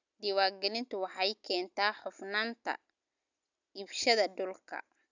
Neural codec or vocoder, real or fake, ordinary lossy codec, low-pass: none; real; none; 7.2 kHz